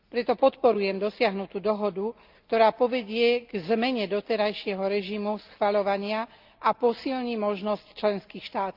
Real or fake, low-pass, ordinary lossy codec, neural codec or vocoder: real; 5.4 kHz; Opus, 24 kbps; none